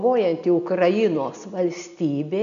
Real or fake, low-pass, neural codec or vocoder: real; 7.2 kHz; none